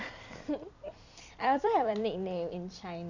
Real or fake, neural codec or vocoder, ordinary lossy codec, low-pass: fake; vocoder, 44.1 kHz, 128 mel bands every 256 samples, BigVGAN v2; none; 7.2 kHz